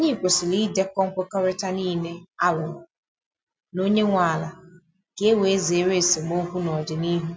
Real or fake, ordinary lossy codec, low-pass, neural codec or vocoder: real; none; none; none